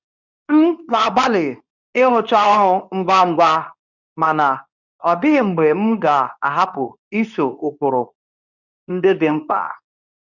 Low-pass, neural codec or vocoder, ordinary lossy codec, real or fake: 7.2 kHz; codec, 24 kHz, 0.9 kbps, WavTokenizer, medium speech release version 2; none; fake